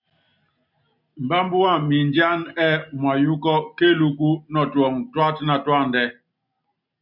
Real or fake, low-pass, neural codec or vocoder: real; 5.4 kHz; none